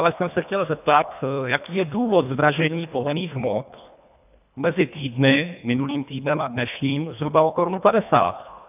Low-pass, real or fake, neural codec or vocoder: 3.6 kHz; fake; codec, 24 kHz, 1.5 kbps, HILCodec